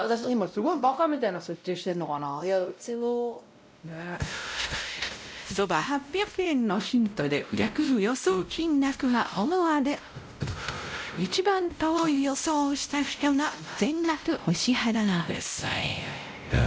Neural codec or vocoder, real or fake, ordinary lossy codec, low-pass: codec, 16 kHz, 0.5 kbps, X-Codec, WavLM features, trained on Multilingual LibriSpeech; fake; none; none